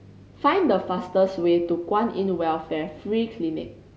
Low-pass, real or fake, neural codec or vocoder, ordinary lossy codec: none; real; none; none